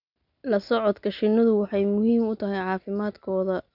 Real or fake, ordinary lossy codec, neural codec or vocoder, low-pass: real; none; none; 5.4 kHz